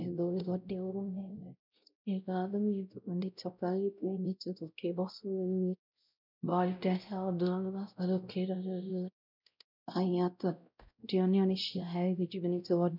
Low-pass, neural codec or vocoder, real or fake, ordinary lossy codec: 5.4 kHz; codec, 16 kHz, 0.5 kbps, X-Codec, WavLM features, trained on Multilingual LibriSpeech; fake; none